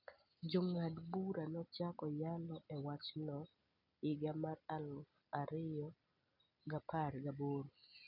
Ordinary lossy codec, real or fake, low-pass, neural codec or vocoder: none; real; 5.4 kHz; none